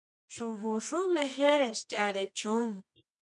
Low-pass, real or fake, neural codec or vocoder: 10.8 kHz; fake; codec, 24 kHz, 0.9 kbps, WavTokenizer, medium music audio release